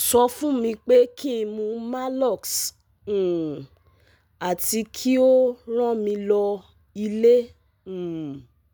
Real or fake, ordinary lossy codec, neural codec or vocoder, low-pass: real; none; none; none